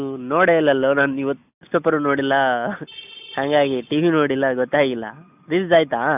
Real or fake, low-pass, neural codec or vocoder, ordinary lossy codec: real; 3.6 kHz; none; none